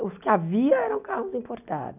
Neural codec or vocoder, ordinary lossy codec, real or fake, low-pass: none; none; real; 3.6 kHz